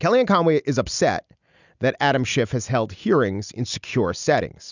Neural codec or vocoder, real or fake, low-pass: none; real; 7.2 kHz